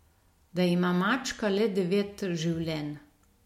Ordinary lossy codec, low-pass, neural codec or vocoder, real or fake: MP3, 64 kbps; 19.8 kHz; none; real